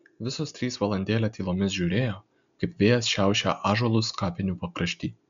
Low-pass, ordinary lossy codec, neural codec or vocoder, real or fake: 7.2 kHz; MP3, 64 kbps; none; real